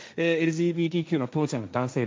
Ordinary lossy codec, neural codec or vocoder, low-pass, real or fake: none; codec, 16 kHz, 1.1 kbps, Voila-Tokenizer; none; fake